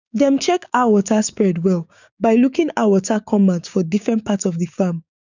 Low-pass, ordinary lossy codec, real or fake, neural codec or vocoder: 7.2 kHz; none; fake; codec, 16 kHz, 6 kbps, DAC